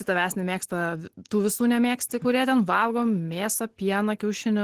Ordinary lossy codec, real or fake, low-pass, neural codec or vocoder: Opus, 16 kbps; real; 14.4 kHz; none